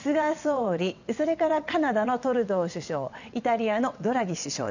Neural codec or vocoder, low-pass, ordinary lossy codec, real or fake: vocoder, 22.05 kHz, 80 mel bands, WaveNeXt; 7.2 kHz; none; fake